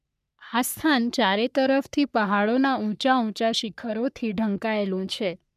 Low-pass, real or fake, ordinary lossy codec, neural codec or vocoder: 14.4 kHz; fake; none; codec, 44.1 kHz, 3.4 kbps, Pupu-Codec